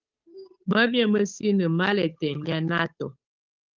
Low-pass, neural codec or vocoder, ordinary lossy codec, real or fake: 7.2 kHz; codec, 16 kHz, 8 kbps, FunCodec, trained on Chinese and English, 25 frames a second; Opus, 24 kbps; fake